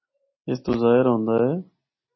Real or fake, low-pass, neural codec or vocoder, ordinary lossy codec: real; 7.2 kHz; none; MP3, 24 kbps